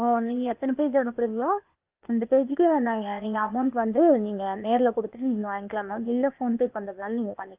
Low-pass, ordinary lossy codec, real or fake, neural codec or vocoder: 3.6 kHz; Opus, 24 kbps; fake; codec, 16 kHz, 0.8 kbps, ZipCodec